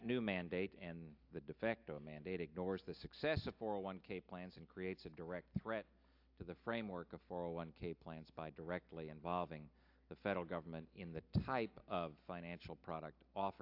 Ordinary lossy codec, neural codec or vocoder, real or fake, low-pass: AAC, 48 kbps; none; real; 5.4 kHz